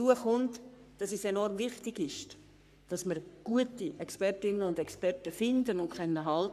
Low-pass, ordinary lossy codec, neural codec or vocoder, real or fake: 14.4 kHz; none; codec, 44.1 kHz, 3.4 kbps, Pupu-Codec; fake